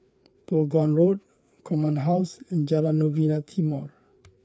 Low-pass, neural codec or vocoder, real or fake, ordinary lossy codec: none; codec, 16 kHz, 4 kbps, FreqCodec, larger model; fake; none